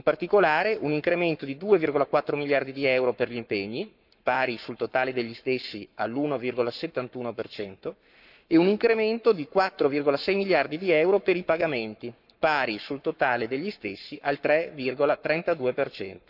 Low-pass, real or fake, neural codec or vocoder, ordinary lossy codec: 5.4 kHz; fake; codec, 44.1 kHz, 7.8 kbps, Pupu-Codec; none